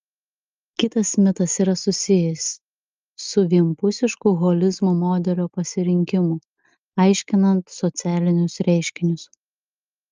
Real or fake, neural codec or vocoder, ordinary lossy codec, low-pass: real; none; Opus, 24 kbps; 7.2 kHz